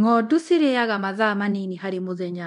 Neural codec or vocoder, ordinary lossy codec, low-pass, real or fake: codec, 24 kHz, 0.9 kbps, DualCodec; MP3, 64 kbps; 10.8 kHz; fake